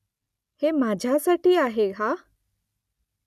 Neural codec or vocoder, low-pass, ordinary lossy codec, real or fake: none; 14.4 kHz; none; real